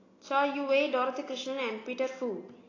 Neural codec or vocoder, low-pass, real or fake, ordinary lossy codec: none; 7.2 kHz; real; AAC, 32 kbps